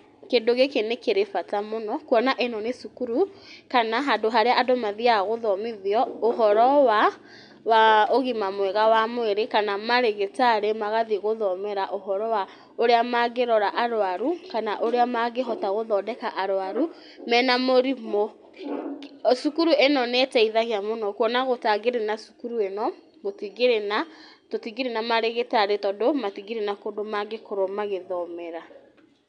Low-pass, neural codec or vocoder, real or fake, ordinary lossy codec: 9.9 kHz; none; real; none